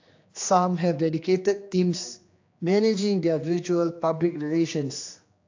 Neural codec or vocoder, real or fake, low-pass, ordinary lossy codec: codec, 16 kHz, 2 kbps, X-Codec, HuBERT features, trained on general audio; fake; 7.2 kHz; AAC, 48 kbps